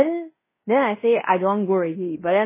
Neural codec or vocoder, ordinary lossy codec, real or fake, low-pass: codec, 16 kHz in and 24 kHz out, 0.9 kbps, LongCat-Audio-Codec, fine tuned four codebook decoder; MP3, 16 kbps; fake; 3.6 kHz